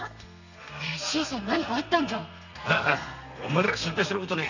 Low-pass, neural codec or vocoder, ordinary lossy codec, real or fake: 7.2 kHz; codec, 32 kHz, 1.9 kbps, SNAC; none; fake